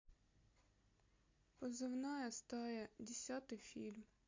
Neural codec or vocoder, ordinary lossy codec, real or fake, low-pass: none; MP3, 48 kbps; real; 7.2 kHz